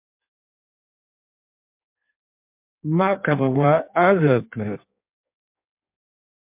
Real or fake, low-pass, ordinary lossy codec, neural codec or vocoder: fake; 3.6 kHz; MP3, 32 kbps; codec, 16 kHz in and 24 kHz out, 1.1 kbps, FireRedTTS-2 codec